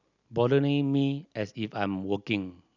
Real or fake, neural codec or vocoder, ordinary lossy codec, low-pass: real; none; none; 7.2 kHz